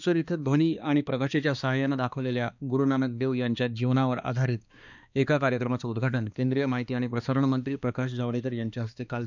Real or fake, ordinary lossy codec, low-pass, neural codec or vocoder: fake; none; 7.2 kHz; codec, 16 kHz, 2 kbps, X-Codec, HuBERT features, trained on balanced general audio